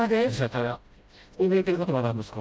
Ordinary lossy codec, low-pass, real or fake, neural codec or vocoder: none; none; fake; codec, 16 kHz, 0.5 kbps, FreqCodec, smaller model